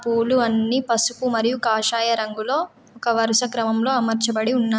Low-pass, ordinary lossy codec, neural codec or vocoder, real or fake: none; none; none; real